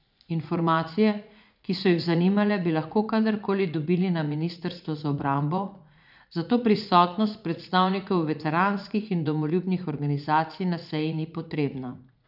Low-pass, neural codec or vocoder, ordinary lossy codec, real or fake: 5.4 kHz; vocoder, 44.1 kHz, 80 mel bands, Vocos; none; fake